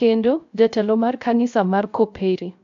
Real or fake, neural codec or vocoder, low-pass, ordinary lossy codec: fake; codec, 16 kHz, 0.3 kbps, FocalCodec; 7.2 kHz; AAC, 64 kbps